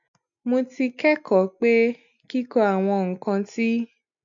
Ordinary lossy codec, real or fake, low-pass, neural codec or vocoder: MP3, 96 kbps; real; 7.2 kHz; none